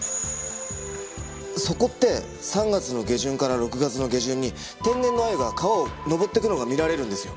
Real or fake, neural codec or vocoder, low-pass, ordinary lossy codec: real; none; none; none